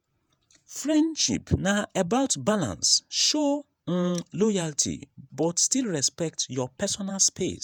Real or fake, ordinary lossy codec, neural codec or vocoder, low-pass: fake; none; vocoder, 48 kHz, 128 mel bands, Vocos; none